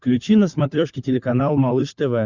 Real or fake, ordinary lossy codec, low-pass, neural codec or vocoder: fake; Opus, 64 kbps; 7.2 kHz; codec, 16 kHz, 16 kbps, FunCodec, trained on LibriTTS, 50 frames a second